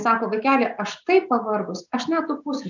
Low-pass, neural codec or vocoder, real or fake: 7.2 kHz; none; real